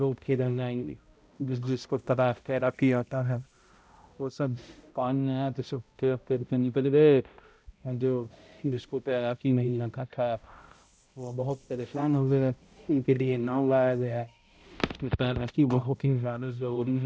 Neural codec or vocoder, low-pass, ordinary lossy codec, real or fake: codec, 16 kHz, 0.5 kbps, X-Codec, HuBERT features, trained on balanced general audio; none; none; fake